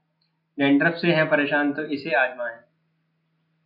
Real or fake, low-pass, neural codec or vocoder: real; 5.4 kHz; none